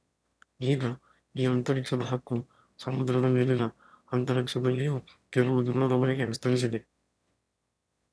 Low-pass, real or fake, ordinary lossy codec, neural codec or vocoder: none; fake; none; autoencoder, 22.05 kHz, a latent of 192 numbers a frame, VITS, trained on one speaker